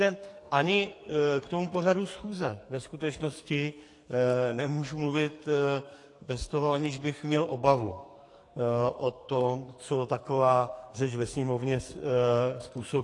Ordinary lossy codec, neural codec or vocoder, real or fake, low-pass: AAC, 48 kbps; codec, 44.1 kHz, 2.6 kbps, SNAC; fake; 10.8 kHz